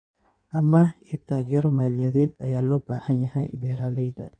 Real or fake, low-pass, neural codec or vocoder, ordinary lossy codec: fake; 9.9 kHz; codec, 16 kHz in and 24 kHz out, 1.1 kbps, FireRedTTS-2 codec; none